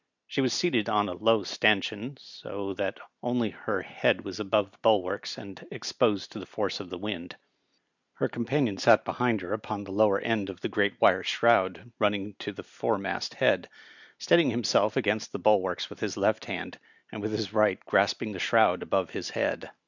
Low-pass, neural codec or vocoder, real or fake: 7.2 kHz; none; real